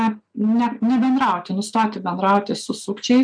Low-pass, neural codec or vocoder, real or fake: 9.9 kHz; none; real